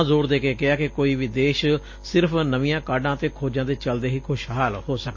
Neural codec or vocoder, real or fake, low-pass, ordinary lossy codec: none; real; 7.2 kHz; MP3, 32 kbps